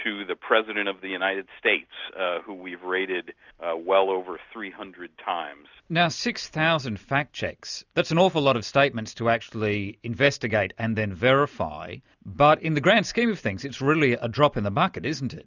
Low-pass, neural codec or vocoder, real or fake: 7.2 kHz; none; real